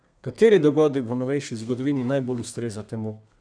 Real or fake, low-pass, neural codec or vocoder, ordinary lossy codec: fake; 9.9 kHz; codec, 32 kHz, 1.9 kbps, SNAC; none